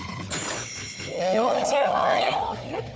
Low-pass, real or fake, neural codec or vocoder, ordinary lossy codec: none; fake; codec, 16 kHz, 4 kbps, FunCodec, trained on Chinese and English, 50 frames a second; none